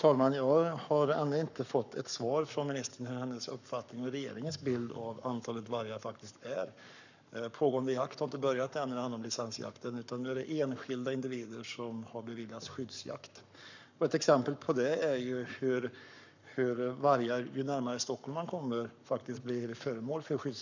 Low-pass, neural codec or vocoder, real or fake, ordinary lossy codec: 7.2 kHz; codec, 44.1 kHz, 7.8 kbps, Pupu-Codec; fake; none